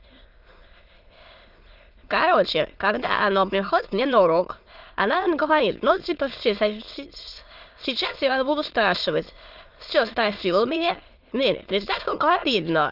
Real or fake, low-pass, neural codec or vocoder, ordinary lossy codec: fake; 5.4 kHz; autoencoder, 22.05 kHz, a latent of 192 numbers a frame, VITS, trained on many speakers; Opus, 24 kbps